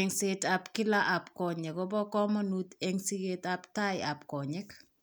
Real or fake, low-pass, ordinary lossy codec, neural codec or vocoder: real; none; none; none